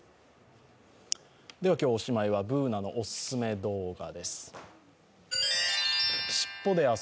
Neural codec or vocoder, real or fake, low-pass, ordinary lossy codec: none; real; none; none